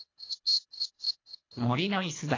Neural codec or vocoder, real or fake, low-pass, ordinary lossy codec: codec, 24 kHz, 1.5 kbps, HILCodec; fake; 7.2 kHz; AAC, 32 kbps